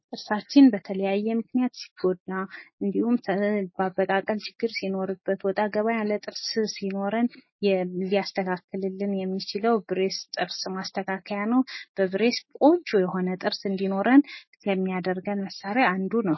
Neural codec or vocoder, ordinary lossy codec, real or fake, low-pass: none; MP3, 24 kbps; real; 7.2 kHz